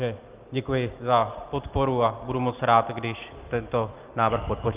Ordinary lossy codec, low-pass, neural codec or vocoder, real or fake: Opus, 32 kbps; 3.6 kHz; none; real